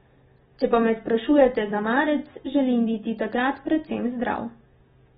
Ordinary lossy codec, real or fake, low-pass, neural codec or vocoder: AAC, 16 kbps; real; 19.8 kHz; none